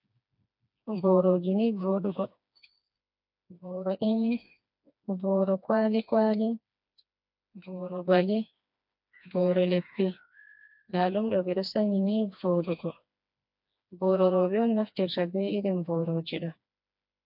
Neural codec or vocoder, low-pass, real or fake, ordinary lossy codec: codec, 16 kHz, 2 kbps, FreqCodec, smaller model; 5.4 kHz; fake; MP3, 48 kbps